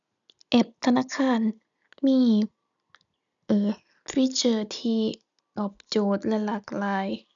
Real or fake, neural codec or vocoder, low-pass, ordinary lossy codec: real; none; 7.2 kHz; none